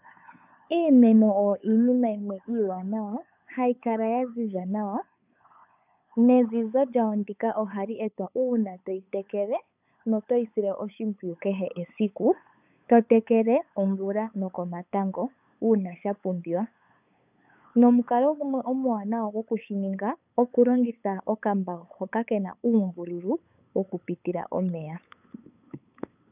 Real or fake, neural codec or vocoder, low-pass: fake; codec, 16 kHz, 8 kbps, FunCodec, trained on LibriTTS, 25 frames a second; 3.6 kHz